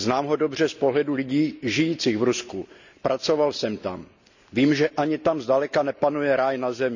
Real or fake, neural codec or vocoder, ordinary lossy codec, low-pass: real; none; none; 7.2 kHz